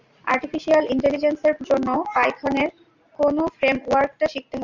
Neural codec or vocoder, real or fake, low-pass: none; real; 7.2 kHz